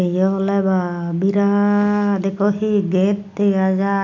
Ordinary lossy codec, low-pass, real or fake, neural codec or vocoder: none; 7.2 kHz; real; none